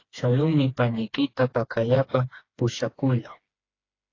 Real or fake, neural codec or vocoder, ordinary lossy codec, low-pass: fake; codec, 16 kHz, 2 kbps, FreqCodec, smaller model; AAC, 32 kbps; 7.2 kHz